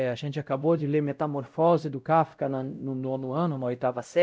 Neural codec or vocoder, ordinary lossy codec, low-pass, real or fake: codec, 16 kHz, 0.5 kbps, X-Codec, WavLM features, trained on Multilingual LibriSpeech; none; none; fake